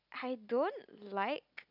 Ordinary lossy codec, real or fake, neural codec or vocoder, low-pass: none; real; none; 5.4 kHz